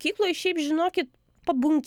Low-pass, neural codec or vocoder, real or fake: 19.8 kHz; none; real